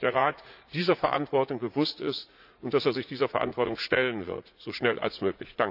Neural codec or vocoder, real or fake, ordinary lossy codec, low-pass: vocoder, 44.1 kHz, 80 mel bands, Vocos; fake; AAC, 48 kbps; 5.4 kHz